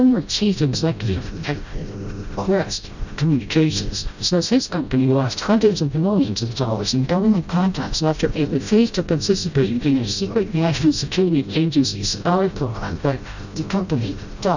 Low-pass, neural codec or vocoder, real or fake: 7.2 kHz; codec, 16 kHz, 0.5 kbps, FreqCodec, smaller model; fake